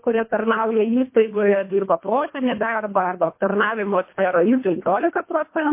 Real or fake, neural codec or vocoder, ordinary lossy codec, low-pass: fake; codec, 24 kHz, 1.5 kbps, HILCodec; MP3, 24 kbps; 3.6 kHz